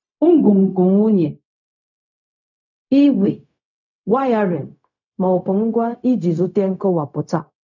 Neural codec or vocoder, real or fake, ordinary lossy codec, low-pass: codec, 16 kHz, 0.4 kbps, LongCat-Audio-Codec; fake; none; 7.2 kHz